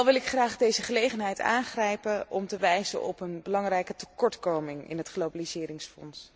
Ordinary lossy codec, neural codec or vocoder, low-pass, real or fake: none; none; none; real